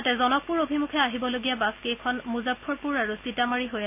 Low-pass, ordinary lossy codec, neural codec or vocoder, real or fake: 3.6 kHz; none; none; real